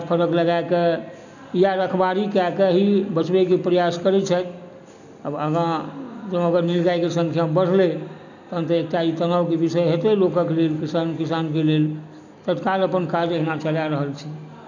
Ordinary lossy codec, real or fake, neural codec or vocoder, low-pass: none; real; none; 7.2 kHz